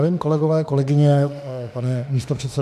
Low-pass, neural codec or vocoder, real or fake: 14.4 kHz; autoencoder, 48 kHz, 32 numbers a frame, DAC-VAE, trained on Japanese speech; fake